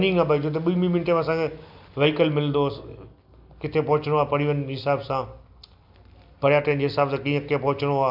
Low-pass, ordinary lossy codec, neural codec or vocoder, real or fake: 5.4 kHz; AAC, 48 kbps; none; real